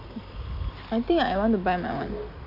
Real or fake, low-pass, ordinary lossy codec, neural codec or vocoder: real; 5.4 kHz; none; none